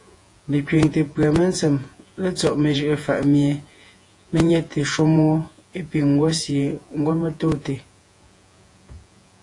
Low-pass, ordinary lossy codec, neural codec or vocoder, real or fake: 10.8 kHz; AAC, 48 kbps; vocoder, 48 kHz, 128 mel bands, Vocos; fake